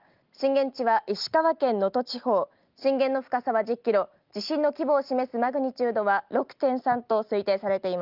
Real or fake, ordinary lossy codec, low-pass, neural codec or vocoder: real; Opus, 32 kbps; 5.4 kHz; none